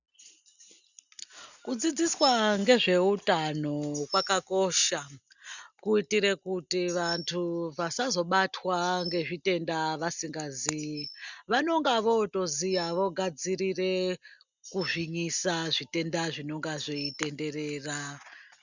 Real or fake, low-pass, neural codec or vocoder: real; 7.2 kHz; none